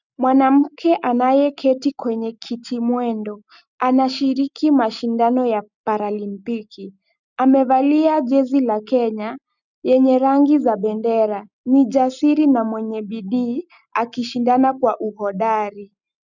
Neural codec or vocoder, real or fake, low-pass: none; real; 7.2 kHz